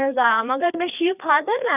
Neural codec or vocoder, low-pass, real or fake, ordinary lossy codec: codec, 16 kHz, 2 kbps, FreqCodec, larger model; 3.6 kHz; fake; none